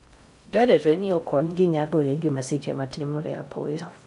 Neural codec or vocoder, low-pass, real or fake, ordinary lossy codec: codec, 16 kHz in and 24 kHz out, 0.6 kbps, FocalCodec, streaming, 4096 codes; 10.8 kHz; fake; none